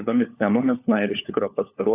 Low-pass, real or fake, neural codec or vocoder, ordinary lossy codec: 3.6 kHz; fake; codec, 16 kHz, 4 kbps, FunCodec, trained on LibriTTS, 50 frames a second; AAC, 32 kbps